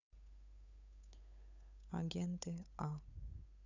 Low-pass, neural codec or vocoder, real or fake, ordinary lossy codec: 7.2 kHz; codec, 16 kHz, 8 kbps, FunCodec, trained on Chinese and English, 25 frames a second; fake; none